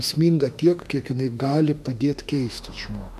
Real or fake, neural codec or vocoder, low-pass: fake; autoencoder, 48 kHz, 32 numbers a frame, DAC-VAE, trained on Japanese speech; 14.4 kHz